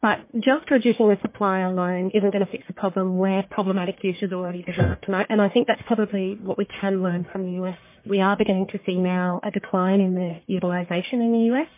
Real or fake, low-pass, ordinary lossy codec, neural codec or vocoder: fake; 3.6 kHz; MP3, 24 kbps; codec, 44.1 kHz, 1.7 kbps, Pupu-Codec